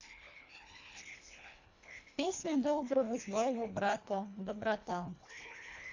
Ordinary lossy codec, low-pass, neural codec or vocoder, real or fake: AAC, 48 kbps; 7.2 kHz; codec, 24 kHz, 1.5 kbps, HILCodec; fake